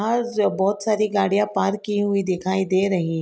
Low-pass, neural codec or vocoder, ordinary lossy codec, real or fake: none; none; none; real